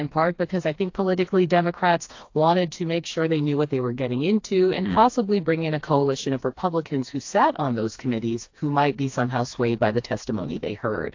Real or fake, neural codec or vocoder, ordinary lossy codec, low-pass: fake; codec, 16 kHz, 2 kbps, FreqCodec, smaller model; AAC, 48 kbps; 7.2 kHz